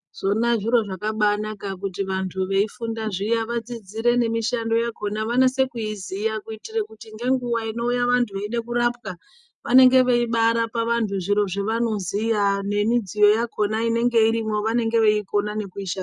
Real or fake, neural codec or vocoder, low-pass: real; none; 10.8 kHz